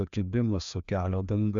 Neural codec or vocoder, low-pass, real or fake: codec, 16 kHz, 2 kbps, FreqCodec, larger model; 7.2 kHz; fake